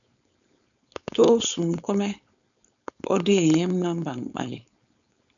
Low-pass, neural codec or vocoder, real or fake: 7.2 kHz; codec, 16 kHz, 4.8 kbps, FACodec; fake